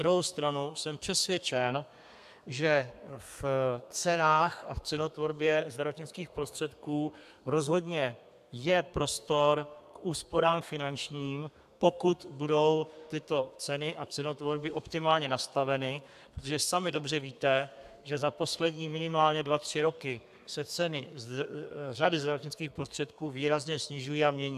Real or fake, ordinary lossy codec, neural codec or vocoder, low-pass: fake; AAC, 96 kbps; codec, 32 kHz, 1.9 kbps, SNAC; 14.4 kHz